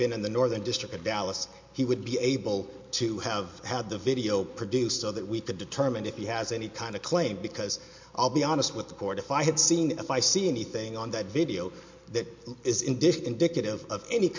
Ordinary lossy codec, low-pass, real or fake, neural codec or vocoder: MP3, 48 kbps; 7.2 kHz; real; none